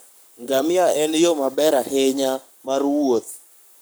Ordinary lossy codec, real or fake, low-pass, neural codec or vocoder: none; fake; none; codec, 44.1 kHz, 7.8 kbps, Pupu-Codec